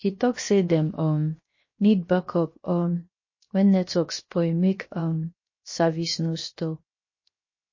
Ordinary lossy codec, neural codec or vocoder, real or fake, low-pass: MP3, 32 kbps; codec, 16 kHz, 0.7 kbps, FocalCodec; fake; 7.2 kHz